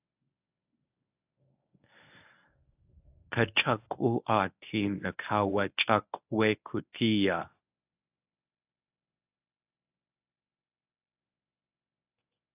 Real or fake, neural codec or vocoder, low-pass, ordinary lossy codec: fake; codec, 24 kHz, 0.9 kbps, WavTokenizer, medium speech release version 1; 3.6 kHz; AAC, 32 kbps